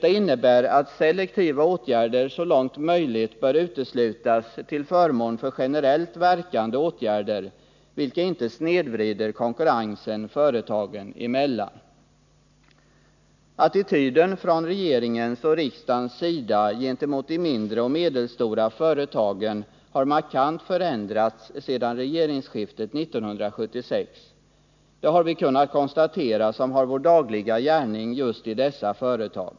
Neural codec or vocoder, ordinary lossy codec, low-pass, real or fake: none; none; 7.2 kHz; real